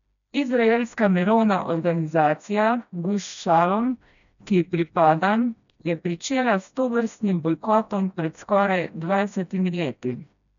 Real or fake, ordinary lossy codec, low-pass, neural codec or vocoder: fake; none; 7.2 kHz; codec, 16 kHz, 1 kbps, FreqCodec, smaller model